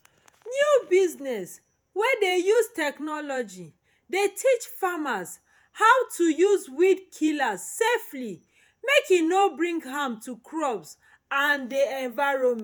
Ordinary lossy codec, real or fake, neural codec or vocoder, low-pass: none; fake; vocoder, 48 kHz, 128 mel bands, Vocos; none